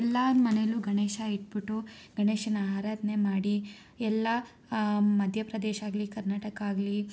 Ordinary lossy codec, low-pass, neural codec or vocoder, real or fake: none; none; none; real